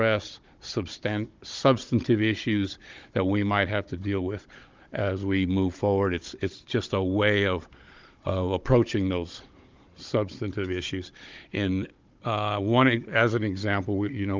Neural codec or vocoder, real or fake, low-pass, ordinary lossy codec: codec, 16 kHz, 16 kbps, FunCodec, trained on Chinese and English, 50 frames a second; fake; 7.2 kHz; Opus, 32 kbps